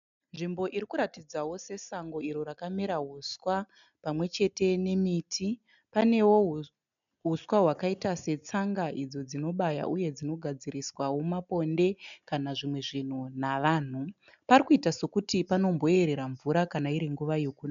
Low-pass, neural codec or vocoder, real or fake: 7.2 kHz; none; real